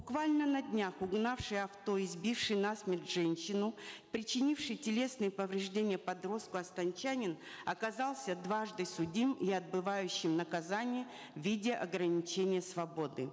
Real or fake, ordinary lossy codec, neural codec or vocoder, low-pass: real; none; none; none